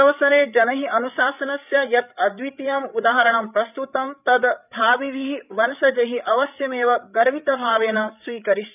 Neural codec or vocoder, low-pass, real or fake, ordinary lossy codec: codec, 16 kHz, 8 kbps, FreqCodec, larger model; 3.6 kHz; fake; none